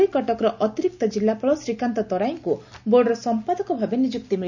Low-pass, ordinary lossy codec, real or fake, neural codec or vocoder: 7.2 kHz; none; real; none